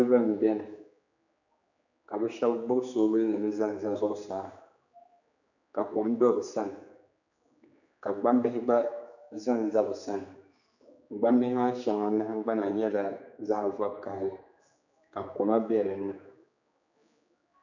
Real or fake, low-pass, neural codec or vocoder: fake; 7.2 kHz; codec, 16 kHz, 4 kbps, X-Codec, HuBERT features, trained on general audio